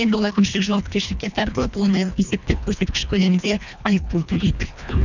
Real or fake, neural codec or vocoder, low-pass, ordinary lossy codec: fake; codec, 24 kHz, 1.5 kbps, HILCodec; 7.2 kHz; none